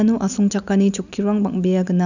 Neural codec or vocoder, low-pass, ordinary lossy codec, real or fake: none; 7.2 kHz; none; real